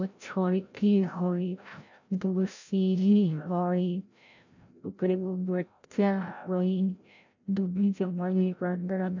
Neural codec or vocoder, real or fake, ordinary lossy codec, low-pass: codec, 16 kHz, 0.5 kbps, FreqCodec, larger model; fake; none; 7.2 kHz